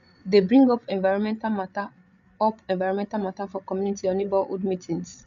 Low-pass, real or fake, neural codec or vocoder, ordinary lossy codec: 7.2 kHz; fake; codec, 16 kHz, 8 kbps, FreqCodec, larger model; MP3, 96 kbps